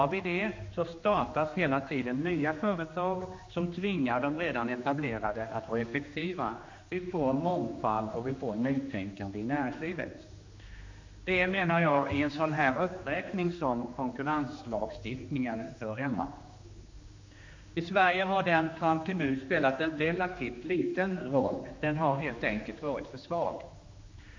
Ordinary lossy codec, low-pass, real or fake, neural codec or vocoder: MP3, 48 kbps; 7.2 kHz; fake; codec, 16 kHz, 2 kbps, X-Codec, HuBERT features, trained on general audio